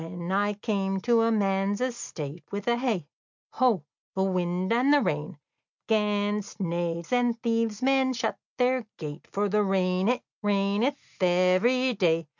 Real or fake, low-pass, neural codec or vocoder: real; 7.2 kHz; none